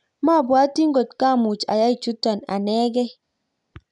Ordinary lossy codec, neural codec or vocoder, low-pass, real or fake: none; none; 10.8 kHz; real